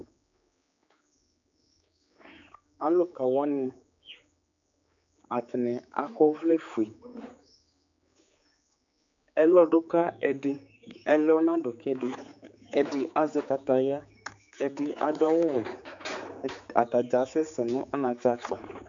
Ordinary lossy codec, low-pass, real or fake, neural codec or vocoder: MP3, 64 kbps; 7.2 kHz; fake; codec, 16 kHz, 4 kbps, X-Codec, HuBERT features, trained on general audio